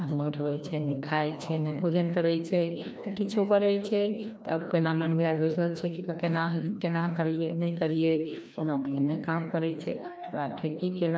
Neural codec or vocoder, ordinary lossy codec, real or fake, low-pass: codec, 16 kHz, 1 kbps, FreqCodec, larger model; none; fake; none